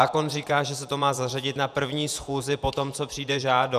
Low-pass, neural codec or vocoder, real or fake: 14.4 kHz; vocoder, 48 kHz, 128 mel bands, Vocos; fake